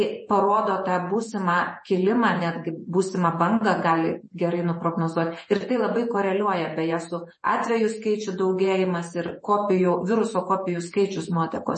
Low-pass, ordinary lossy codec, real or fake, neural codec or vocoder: 10.8 kHz; MP3, 32 kbps; fake; vocoder, 48 kHz, 128 mel bands, Vocos